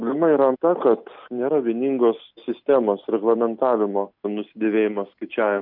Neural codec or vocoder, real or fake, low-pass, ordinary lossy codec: none; real; 5.4 kHz; MP3, 48 kbps